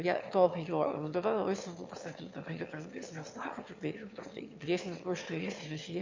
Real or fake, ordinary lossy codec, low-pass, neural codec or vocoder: fake; MP3, 48 kbps; 7.2 kHz; autoencoder, 22.05 kHz, a latent of 192 numbers a frame, VITS, trained on one speaker